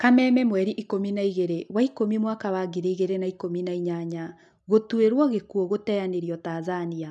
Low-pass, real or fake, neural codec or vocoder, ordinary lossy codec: none; real; none; none